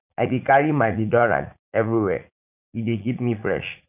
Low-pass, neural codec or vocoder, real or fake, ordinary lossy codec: 3.6 kHz; vocoder, 44.1 kHz, 80 mel bands, Vocos; fake; none